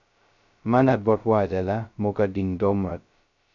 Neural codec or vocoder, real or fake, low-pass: codec, 16 kHz, 0.2 kbps, FocalCodec; fake; 7.2 kHz